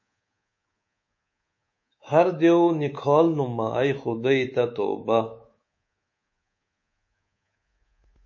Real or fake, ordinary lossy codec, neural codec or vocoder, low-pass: fake; MP3, 32 kbps; codec, 24 kHz, 3.1 kbps, DualCodec; 7.2 kHz